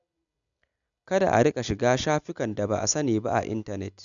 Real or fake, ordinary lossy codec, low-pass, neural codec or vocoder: real; none; 7.2 kHz; none